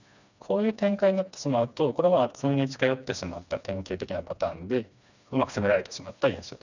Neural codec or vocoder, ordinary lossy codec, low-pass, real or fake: codec, 16 kHz, 2 kbps, FreqCodec, smaller model; none; 7.2 kHz; fake